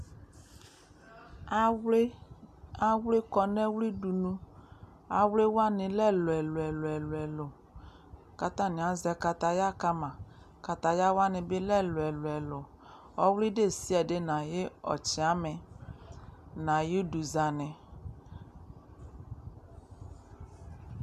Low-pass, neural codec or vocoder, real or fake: 14.4 kHz; none; real